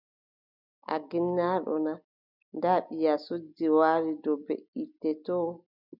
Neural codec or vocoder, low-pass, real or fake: none; 5.4 kHz; real